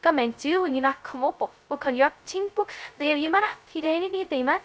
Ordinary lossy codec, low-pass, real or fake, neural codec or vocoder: none; none; fake; codec, 16 kHz, 0.2 kbps, FocalCodec